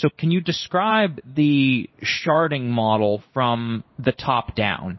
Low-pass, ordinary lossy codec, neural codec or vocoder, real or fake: 7.2 kHz; MP3, 24 kbps; codec, 16 kHz in and 24 kHz out, 1 kbps, XY-Tokenizer; fake